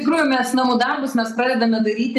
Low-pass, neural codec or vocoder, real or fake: 14.4 kHz; none; real